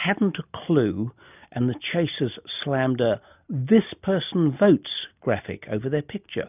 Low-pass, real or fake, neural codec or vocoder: 3.6 kHz; real; none